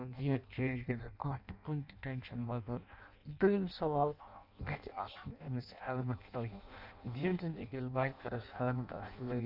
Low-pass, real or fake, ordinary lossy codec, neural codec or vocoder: 5.4 kHz; fake; none; codec, 16 kHz in and 24 kHz out, 0.6 kbps, FireRedTTS-2 codec